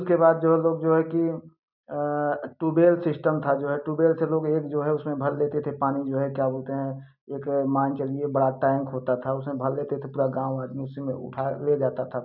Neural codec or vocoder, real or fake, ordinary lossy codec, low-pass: none; real; none; 5.4 kHz